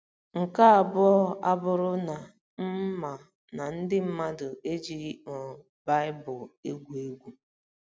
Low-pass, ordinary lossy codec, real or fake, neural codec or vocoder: none; none; real; none